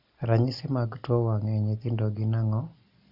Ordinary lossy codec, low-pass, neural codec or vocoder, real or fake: none; 5.4 kHz; none; real